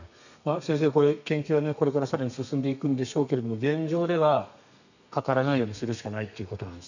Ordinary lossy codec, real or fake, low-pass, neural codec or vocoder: none; fake; 7.2 kHz; codec, 32 kHz, 1.9 kbps, SNAC